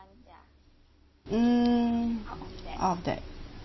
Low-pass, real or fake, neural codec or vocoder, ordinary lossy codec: 7.2 kHz; real; none; MP3, 24 kbps